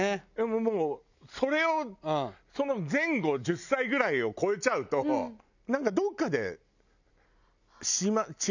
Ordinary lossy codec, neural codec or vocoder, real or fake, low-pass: none; none; real; 7.2 kHz